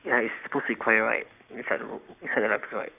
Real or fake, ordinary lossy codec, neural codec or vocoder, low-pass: fake; none; codec, 44.1 kHz, 7.8 kbps, Pupu-Codec; 3.6 kHz